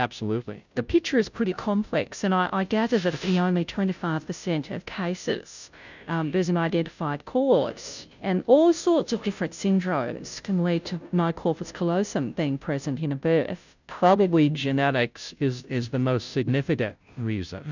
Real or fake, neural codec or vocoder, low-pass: fake; codec, 16 kHz, 0.5 kbps, FunCodec, trained on Chinese and English, 25 frames a second; 7.2 kHz